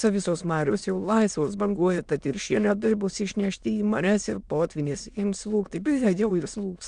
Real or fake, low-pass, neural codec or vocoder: fake; 9.9 kHz; autoencoder, 22.05 kHz, a latent of 192 numbers a frame, VITS, trained on many speakers